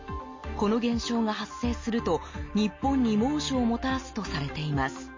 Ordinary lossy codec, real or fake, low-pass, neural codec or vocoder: MP3, 32 kbps; real; 7.2 kHz; none